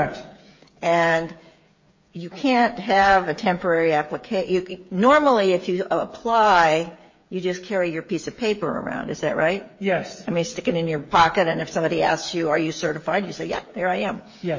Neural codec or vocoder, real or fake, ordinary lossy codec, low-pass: codec, 16 kHz, 8 kbps, FreqCodec, smaller model; fake; MP3, 32 kbps; 7.2 kHz